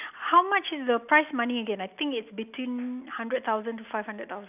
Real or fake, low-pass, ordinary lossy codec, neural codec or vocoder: real; 3.6 kHz; none; none